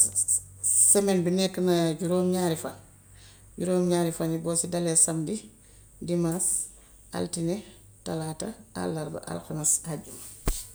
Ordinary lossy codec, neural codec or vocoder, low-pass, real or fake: none; none; none; real